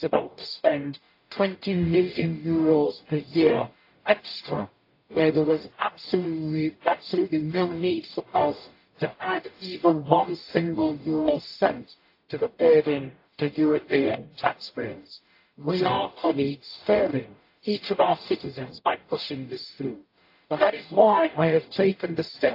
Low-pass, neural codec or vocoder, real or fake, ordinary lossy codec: 5.4 kHz; codec, 44.1 kHz, 0.9 kbps, DAC; fake; AAC, 32 kbps